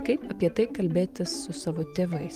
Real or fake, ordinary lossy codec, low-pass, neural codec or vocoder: fake; Opus, 32 kbps; 14.4 kHz; vocoder, 44.1 kHz, 128 mel bands every 512 samples, BigVGAN v2